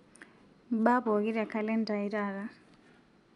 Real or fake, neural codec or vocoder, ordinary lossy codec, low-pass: real; none; none; 10.8 kHz